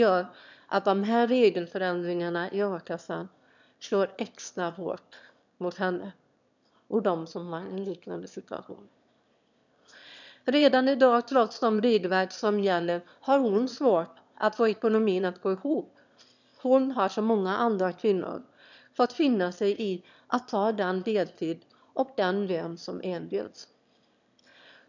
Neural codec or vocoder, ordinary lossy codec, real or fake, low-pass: autoencoder, 22.05 kHz, a latent of 192 numbers a frame, VITS, trained on one speaker; none; fake; 7.2 kHz